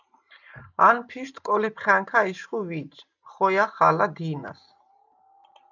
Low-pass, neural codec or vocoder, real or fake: 7.2 kHz; none; real